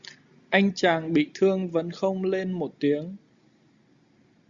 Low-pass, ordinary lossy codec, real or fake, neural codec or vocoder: 7.2 kHz; Opus, 64 kbps; real; none